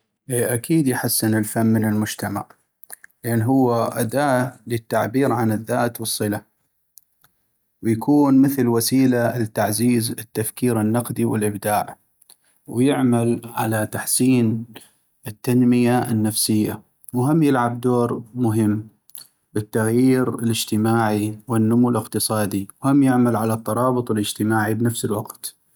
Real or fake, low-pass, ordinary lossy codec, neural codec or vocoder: real; none; none; none